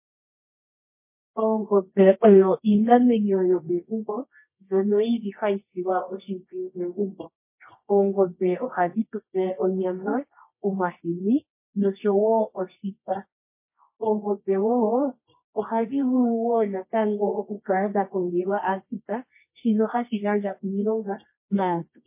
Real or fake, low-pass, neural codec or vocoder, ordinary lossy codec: fake; 3.6 kHz; codec, 24 kHz, 0.9 kbps, WavTokenizer, medium music audio release; MP3, 24 kbps